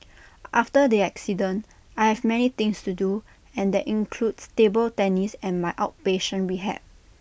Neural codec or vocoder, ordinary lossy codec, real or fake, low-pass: none; none; real; none